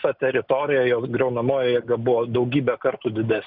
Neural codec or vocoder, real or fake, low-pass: none; real; 5.4 kHz